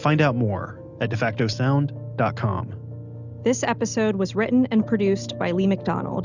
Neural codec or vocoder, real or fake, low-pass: none; real; 7.2 kHz